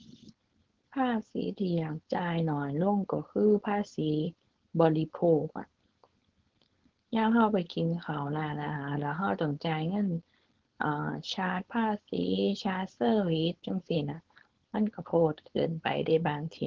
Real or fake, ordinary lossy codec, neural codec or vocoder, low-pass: fake; Opus, 16 kbps; codec, 16 kHz, 4.8 kbps, FACodec; 7.2 kHz